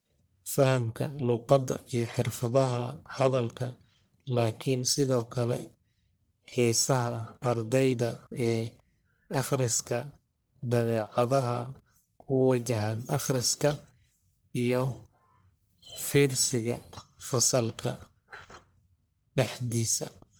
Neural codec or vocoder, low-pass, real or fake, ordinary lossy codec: codec, 44.1 kHz, 1.7 kbps, Pupu-Codec; none; fake; none